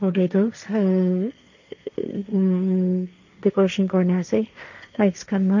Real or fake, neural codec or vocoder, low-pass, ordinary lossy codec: fake; codec, 16 kHz, 1.1 kbps, Voila-Tokenizer; 7.2 kHz; none